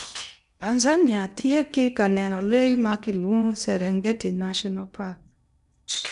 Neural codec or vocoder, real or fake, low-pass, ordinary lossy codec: codec, 16 kHz in and 24 kHz out, 0.8 kbps, FocalCodec, streaming, 65536 codes; fake; 10.8 kHz; none